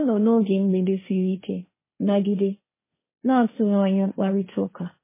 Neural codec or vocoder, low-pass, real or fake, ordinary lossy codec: codec, 16 kHz, 1.1 kbps, Voila-Tokenizer; 3.6 kHz; fake; MP3, 16 kbps